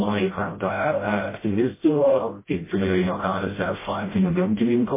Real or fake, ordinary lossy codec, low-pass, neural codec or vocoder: fake; MP3, 16 kbps; 3.6 kHz; codec, 16 kHz, 0.5 kbps, FreqCodec, smaller model